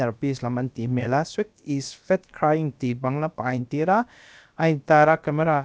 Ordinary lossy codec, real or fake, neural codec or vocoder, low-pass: none; fake; codec, 16 kHz, about 1 kbps, DyCAST, with the encoder's durations; none